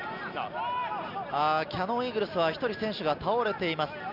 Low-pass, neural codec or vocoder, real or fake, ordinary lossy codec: 5.4 kHz; none; real; none